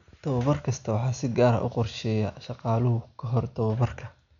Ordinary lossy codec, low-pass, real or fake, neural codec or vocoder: none; 7.2 kHz; real; none